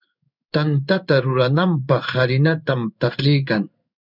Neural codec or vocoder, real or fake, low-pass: codec, 16 kHz in and 24 kHz out, 1 kbps, XY-Tokenizer; fake; 5.4 kHz